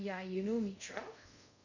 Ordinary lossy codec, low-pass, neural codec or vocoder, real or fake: none; 7.2 kHz; codec, 24 kHz, 0.5 kbps, DualCodec; fake